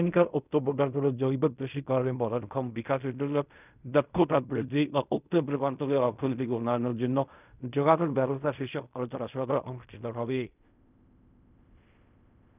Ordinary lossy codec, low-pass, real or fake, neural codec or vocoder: none; 3.6 kHz; fake; codec, 16 kHz in and 24 kHz out, 0.4 kbps, LongCat-Audio-Codec, fine tuned four codebook decoder